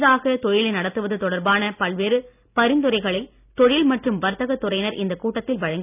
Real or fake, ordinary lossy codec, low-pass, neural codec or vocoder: real; none; 3.6 kHz; none